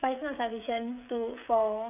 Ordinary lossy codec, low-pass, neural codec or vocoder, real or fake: none; 3.6 kHz; codec, 16 kHz, 16 kbps, FreqCodec, smaller model; fake